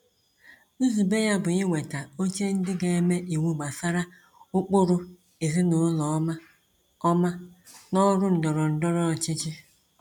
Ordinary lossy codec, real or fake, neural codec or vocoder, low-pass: none; real; none; 19.8 kHz